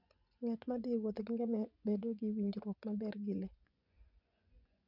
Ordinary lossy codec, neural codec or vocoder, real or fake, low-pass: none; vocoder, 24 kHz, 100 mel bands, Vocos; fake; 5.4 kHz